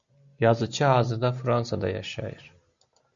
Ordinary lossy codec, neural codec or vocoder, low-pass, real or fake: MP3, 48 kbps; none; 7.2 kHz; real